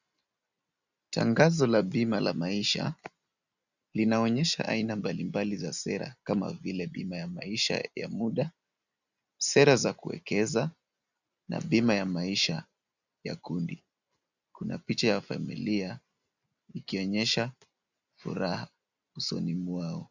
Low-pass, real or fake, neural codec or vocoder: 7.2 kHz; real; none